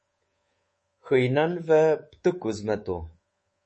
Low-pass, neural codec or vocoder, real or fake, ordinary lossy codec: 10.8 kHz; codec, 24 kHz, 3.1 kbps, DualCodec; fake; MP3, 32 kbps